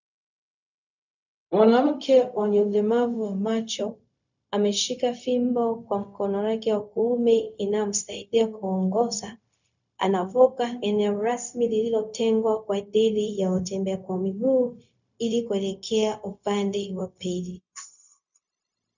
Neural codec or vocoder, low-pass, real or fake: codec, 16 kHz, 0.4 kbps, LongCat-Audio-Codec; 7.2 kHz; fake